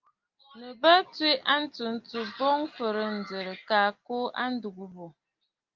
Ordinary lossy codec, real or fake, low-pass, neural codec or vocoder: Opus, 32 kbps; real; 7.2 kHz; none